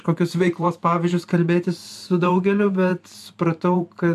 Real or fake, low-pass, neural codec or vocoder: fake; 14.4 kHz; vocoder, 44.1 kHz, 128 mel bands every 512 samples, BigVGAN v2